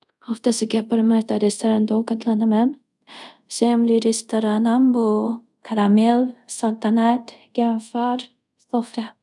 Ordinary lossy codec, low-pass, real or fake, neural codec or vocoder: none; 10.8 kHz; fake; codec, 24 kHz, 0.5 kbps, DualCodec